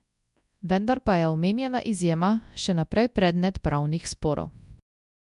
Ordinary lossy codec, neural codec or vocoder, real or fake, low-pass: MP3, 96 kbps; codec, 24 kHz, 0.9 kbps, WavTokenizer, large speech release; fake; 10.8 kHz